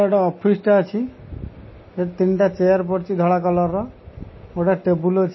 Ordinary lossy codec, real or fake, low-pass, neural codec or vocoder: MP3, 24 kbps; real; 7.2 kHz; none